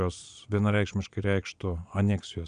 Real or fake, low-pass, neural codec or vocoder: fake; 9.9 kHz; vocoder, 22.05 kHz, 80 mel bands, Vocos